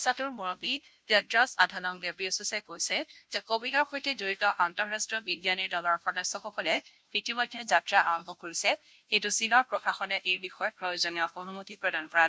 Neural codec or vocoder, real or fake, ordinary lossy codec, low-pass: codec, 16 kHz, 0.5 kbps, FunCodec, trained on Chinese and English, 25 frames a second; fake; none; none